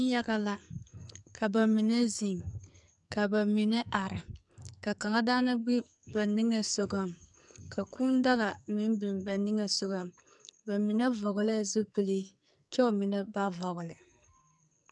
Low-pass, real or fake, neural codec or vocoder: 10.8 kHz; fake; codec, 44.1 kHz, 2.6 kbps, SNAC